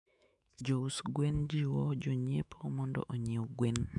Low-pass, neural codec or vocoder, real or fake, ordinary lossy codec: 10.8 kHz; autoencoder, 48 kHz, 128 numbers a frame, DAC-VAE, trained on Japanese speech; fake; none